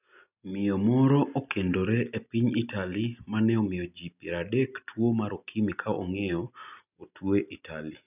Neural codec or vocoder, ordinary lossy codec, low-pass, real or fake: none; none; 3.6 kHz; real